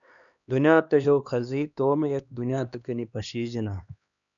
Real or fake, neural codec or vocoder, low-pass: fake; codec, 16 kHz, 2 kbps, X-Codec, HuBERT features, trained on LibriSpeech; 7.2 kHz